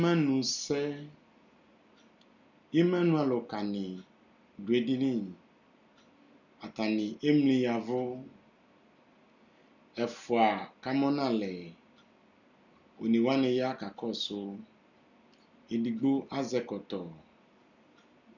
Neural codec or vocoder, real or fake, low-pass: none; real; 7.2 kHz